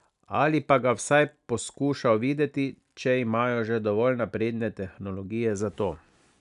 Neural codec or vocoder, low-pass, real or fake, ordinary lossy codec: none; 10.8 kHz; real; none